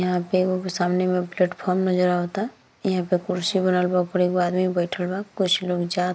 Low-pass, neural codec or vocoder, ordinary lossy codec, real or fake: none; none; none; real